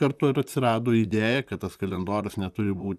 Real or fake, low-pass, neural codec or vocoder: fake; 14.4 kHz; vocoder, 44.1 kHz, 128 mel bands, Pupu-Vocoder